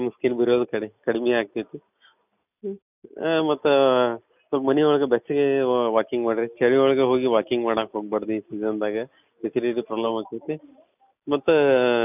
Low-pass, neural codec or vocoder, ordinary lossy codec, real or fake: 3.6 kHz; none; none; real